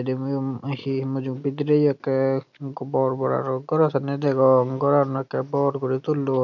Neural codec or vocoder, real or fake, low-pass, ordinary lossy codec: none; real; 7.2 kHz; none